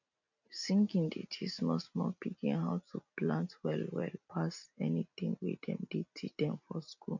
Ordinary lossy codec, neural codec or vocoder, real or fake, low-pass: none; none; real; 7.2 kHz